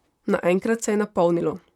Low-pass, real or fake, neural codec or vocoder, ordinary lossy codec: 19.8 kHz; fake; vocoder, 44.1 kHz, 128 mel bands, Pupu-Vocoder; none